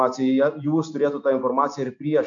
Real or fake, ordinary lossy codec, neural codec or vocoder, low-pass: real; AAC, 64 kbps; none; 7.2 kHz